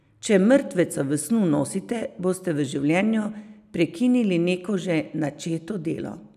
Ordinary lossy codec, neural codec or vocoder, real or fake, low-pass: none; none; real; 14.4 kHz